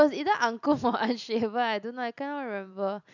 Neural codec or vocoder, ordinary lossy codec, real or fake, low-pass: none; none; real; 7.2 kHz